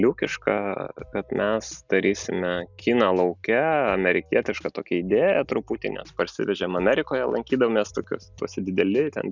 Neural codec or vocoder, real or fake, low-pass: none; real; 7.2 kHz